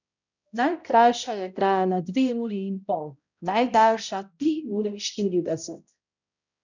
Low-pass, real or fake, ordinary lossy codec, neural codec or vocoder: 7.2 kHz; fake; none; codec, 16 kHz, 0.5 kbps, X-Codec, HuBERT features, trained on balanced general audio